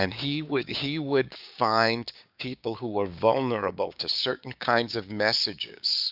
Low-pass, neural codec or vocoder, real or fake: 5.4 kHz; codec, 16 kHz, 6 kbps, DAC; fake